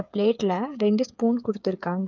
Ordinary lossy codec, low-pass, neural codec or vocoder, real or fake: none; 7.2 kHz; codec, 16 kHz, 8 kbps, FreqCodec, smaller model; fake